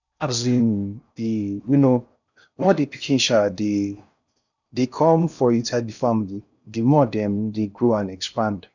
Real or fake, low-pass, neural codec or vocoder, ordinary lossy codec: fake; 7.2 kHz; codec, 16 kHz in and 24 kHz out, 0.6 kbps, FocalCodec, streaming, 4096 codes; none